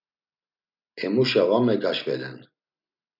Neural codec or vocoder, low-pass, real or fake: none; 5.4 kHz; real